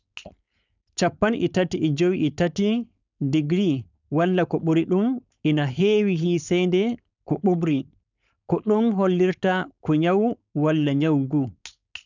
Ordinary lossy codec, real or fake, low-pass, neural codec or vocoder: none; fake; 7.2 kHz; codec, 16 kHz, 4.8 kbps, FACodec